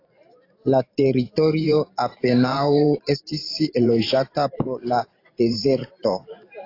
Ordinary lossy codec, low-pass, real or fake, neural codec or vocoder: AAC, 32 kbps; 5.4 kHz; fake; vocoder, 44.1 kHz, 128 mel bands every 512 samples, BigVGAN v2